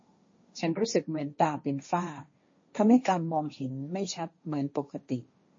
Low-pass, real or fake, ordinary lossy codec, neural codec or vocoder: 7.2 kHz; fake; MP3, 32 kbps; codec, 16 kHz, 1.1 kbps, Voila-Tokenizer